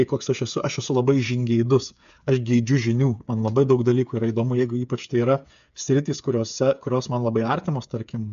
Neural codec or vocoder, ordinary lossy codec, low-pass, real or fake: codec, 16 kHz, 8 kbps, FreqCodec, smaller model; AAC, 96 kbps; 7.2 kHz; fake